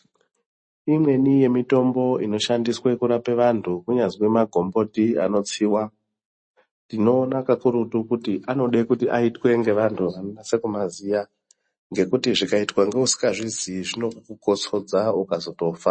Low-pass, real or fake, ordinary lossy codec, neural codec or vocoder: 9.9 kHz; real; MP3, 32 kbps; none